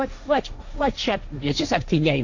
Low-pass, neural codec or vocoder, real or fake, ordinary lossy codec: 7.2 kHz; codec, 16 kHz, 1.1 kbps, Voila-Tokenizer; fake; AAC, 48 kbps